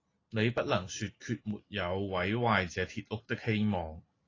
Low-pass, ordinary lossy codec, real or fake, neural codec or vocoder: 7.2 kHz; AAC, 32 kbps; real; none